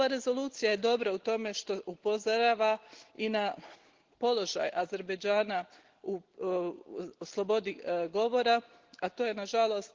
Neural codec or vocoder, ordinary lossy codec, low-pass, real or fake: none; Opus, 16 kbps; 7.2 kHz; real